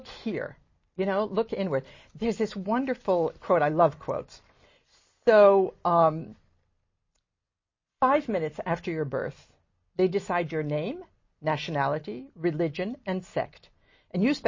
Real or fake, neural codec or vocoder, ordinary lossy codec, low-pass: real; none; MP3, 32 kbps; 7.2 kHz